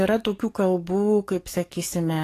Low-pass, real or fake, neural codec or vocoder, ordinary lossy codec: 14.4 kHz; fake; codec, 44.1 kHz, 7.8 kbps, DAC; AAC, 48 kbps